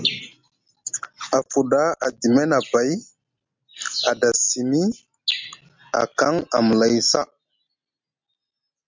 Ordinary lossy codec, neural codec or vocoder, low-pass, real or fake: MP3, 64 kbps; none; 7.2 kHz; real